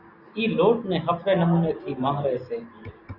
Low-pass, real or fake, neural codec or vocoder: 5.4 kHz; real; none